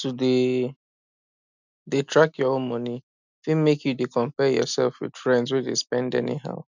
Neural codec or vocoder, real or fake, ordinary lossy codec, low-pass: none; real; none; 7.2 kHz